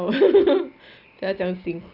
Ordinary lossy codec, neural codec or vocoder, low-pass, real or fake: none; none; 5.4 kHz; real